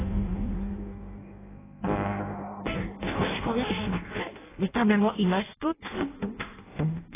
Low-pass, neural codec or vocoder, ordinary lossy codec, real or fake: 3.6 kHz; codec, 16 kHz in and 24 kHz out, 0.6 kbps, FireRedTTS-2 codec; AAC, 16 kbps; fake